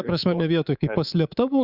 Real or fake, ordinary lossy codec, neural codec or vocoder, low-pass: fake; Opus, 64 kbps; codec, 24 kHz, 3.1 kbps, DualCodec; 5.4 kHz